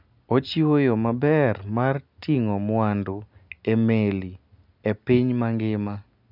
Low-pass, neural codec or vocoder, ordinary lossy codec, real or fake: 5.4 kHz; none; none; real